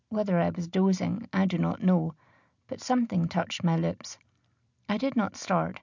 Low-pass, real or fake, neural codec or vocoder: 7.2 kHz; real; none